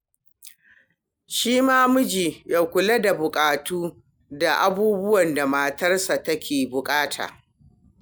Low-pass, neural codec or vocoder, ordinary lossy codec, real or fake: none; none; none; real